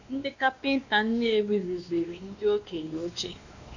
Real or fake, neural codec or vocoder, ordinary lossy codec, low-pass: fake; codec, 16 kHz, 2 kbps, X-Codec, WavLM features, trained on Multilingual LibriSpeech; none; 7.2 kHz